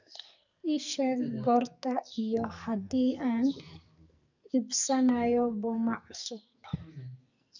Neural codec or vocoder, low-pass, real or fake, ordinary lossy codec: codec, 44.1 kHz, 2.6 kbps, SNAC; 7.2 kHz; fake; none